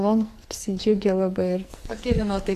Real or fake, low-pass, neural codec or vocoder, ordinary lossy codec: fake; 14.4 kHz; codec, 44.1 kHz, 2.6 kbps, SNAC; AAC, 64 kbps